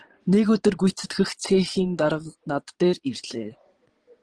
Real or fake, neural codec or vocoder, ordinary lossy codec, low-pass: fake; vocoder, 22.05 kHz, 80 mel bands, Vocos; Opus, 16 kbps; 9.9 kHz